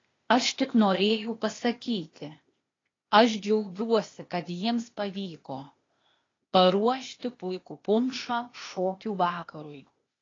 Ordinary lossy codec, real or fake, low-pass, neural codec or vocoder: AAC, 32 kbps; fake; 7.2 kHz; codec, 16 kHz, 0.8 kbps, ZipCodec